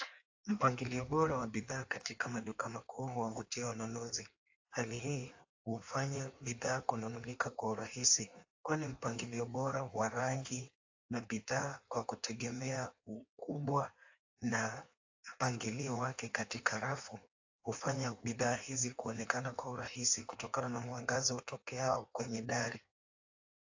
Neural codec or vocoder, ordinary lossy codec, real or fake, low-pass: codec, 16 kHz in and 24 kHz out, 1.1 kbps, FireRedTTS-2 codec; AAC, 48 kbps; fake; 7.2 kHz